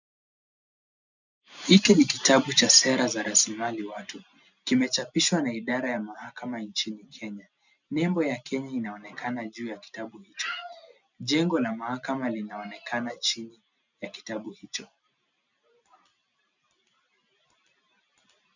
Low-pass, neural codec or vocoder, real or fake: 7.2 kHz; none; real